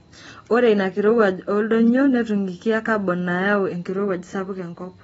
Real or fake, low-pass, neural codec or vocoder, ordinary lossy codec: real; 19.8 kHz; none; AAC, 24 kbps